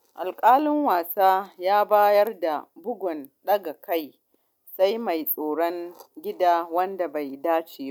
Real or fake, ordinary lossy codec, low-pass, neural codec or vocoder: real; none; 19.8 kHz; none